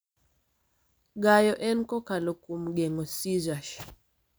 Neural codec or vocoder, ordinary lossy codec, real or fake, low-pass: none; none; real; none